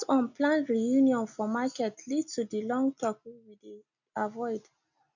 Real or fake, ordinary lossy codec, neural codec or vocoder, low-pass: real; none; none; 7.2 kHz